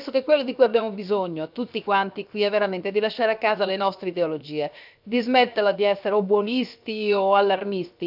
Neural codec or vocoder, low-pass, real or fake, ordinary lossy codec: codec, 16 kHz, 0.7 kbps, FocalCodec; 5.4 kHz; fake; none